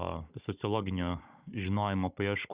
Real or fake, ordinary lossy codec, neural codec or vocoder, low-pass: fake; Opus, 64 kbps; codec, 16 kHz, 16 kbps, FunCodec, trained on Chinese and English, 50 frames a second; 3.6 kHz